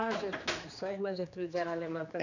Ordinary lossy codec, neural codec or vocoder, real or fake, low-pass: none; codec, 16 kHz, 2 kbps, X-Codec, HuBERT features, trained on general audio; fake; 7.2 kHz